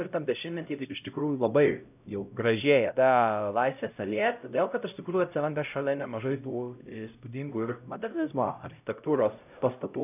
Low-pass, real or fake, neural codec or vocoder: 3.6 kHz; fake; codec, 16 kHz, 0.5 kbps, X-Codec, HuBERT features, trained on LibriSpeech